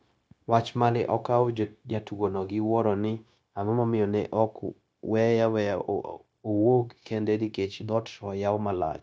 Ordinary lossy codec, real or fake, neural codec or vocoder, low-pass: none; fake; codec, 16 kHz, 0.9 kbps, LongCat-Audio-Codec; none